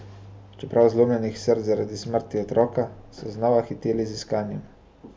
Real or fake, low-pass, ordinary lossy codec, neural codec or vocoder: real; none; none; none